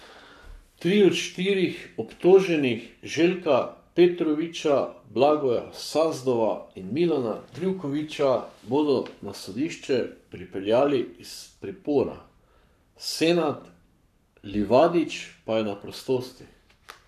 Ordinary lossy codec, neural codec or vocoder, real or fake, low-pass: none; codec, 44.1 kHz, 7.8 kbps, Pupu-Codec; fake; 14.4 kHz